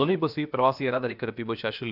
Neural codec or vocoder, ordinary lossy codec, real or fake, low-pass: codec, 16 kHz, about 1 kbps, DyCAST, with the encoder's durations; none; fake; 5.4 kHz